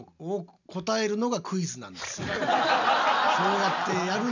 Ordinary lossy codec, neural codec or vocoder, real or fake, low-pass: none; none; real; 7.2 kHz